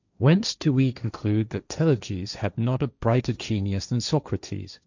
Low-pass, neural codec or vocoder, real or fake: 7.2 kHz; codec, 16 kHz, 1.1 kbps, Voila-Tokenizer; fake